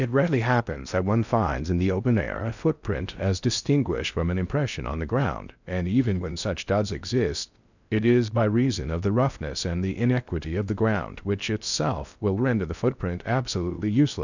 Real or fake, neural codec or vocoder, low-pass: fake; codec, 16 kHz in and 24 kHz out, 0.6 kbps, FocalCodec, streaming, 4096 codes; 7.2 kHz